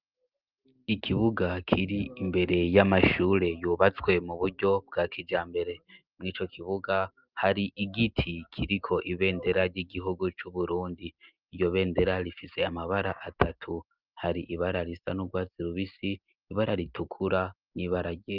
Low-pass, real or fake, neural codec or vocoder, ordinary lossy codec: 5.4 kHz; real; none; Opus, 32 kbps